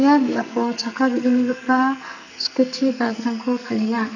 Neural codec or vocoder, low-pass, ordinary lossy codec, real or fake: codec, 44.1 kHz, 2.6 kbps, SNAC; 7.2 kHz; none; fake